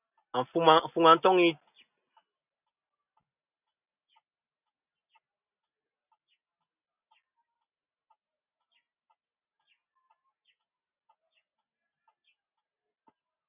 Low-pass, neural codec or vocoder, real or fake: 3.6 kHz; none; real